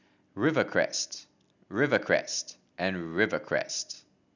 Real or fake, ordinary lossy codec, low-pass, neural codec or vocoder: real; none; 7.2 kHz; none